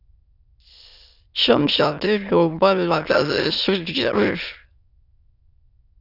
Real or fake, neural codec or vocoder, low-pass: fake; autoencoder, 22.05 kHz, a latent of 192 numbers a frame, VITS, trained on many speakers; 5.4 kHz